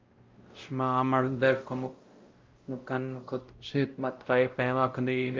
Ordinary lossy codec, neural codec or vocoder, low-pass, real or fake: Opus, 24 kbps; codec, 16 kHz, 0.5 kbps, X-Codec, WavLM features, trained on Multilingual LibriSpeech; 7.2 kHz; fake